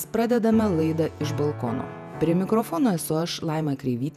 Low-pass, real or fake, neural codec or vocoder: 14.4 kHz; fake; vocoder, 48 kHz, 128 mel bands, Vocos